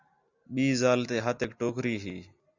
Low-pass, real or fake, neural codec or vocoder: 7.2 kHz; real; none